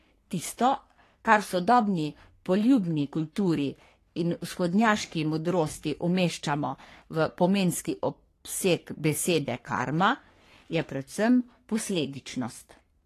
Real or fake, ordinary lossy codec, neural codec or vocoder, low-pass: fake; AAC, 48 kbps; codec, 44.1 kHz, 3.4 kbps, Pupu-Codec; 14.4 kHz